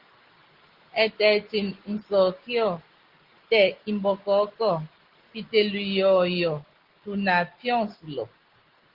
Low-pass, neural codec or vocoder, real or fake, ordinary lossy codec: 5.4 kHz; none; real; Opus, 16 kbps